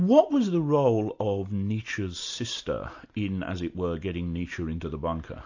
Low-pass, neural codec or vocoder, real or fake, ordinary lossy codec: 7.2 kHz; none; real; AAC, 48 kbps